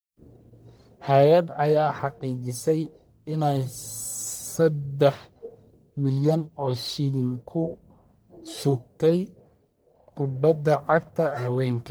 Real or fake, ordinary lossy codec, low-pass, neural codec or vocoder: fake; none; none; codec, 44.1 kHz, 1.7 kbps, Pupu-Codec